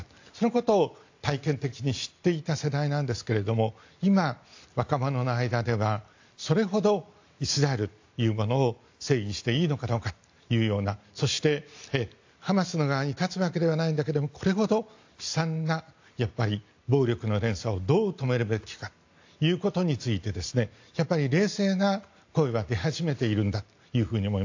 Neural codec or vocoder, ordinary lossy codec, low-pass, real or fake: none; AAC, 48 kbps; 7.2 kHz; real